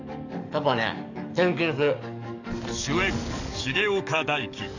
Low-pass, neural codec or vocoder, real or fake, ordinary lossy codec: 7.2 kHz; codec, 44.1 kHz, 7.8 kbps, DAC; fake; none